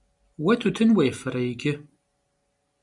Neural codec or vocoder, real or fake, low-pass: none; real; 10.8 kHz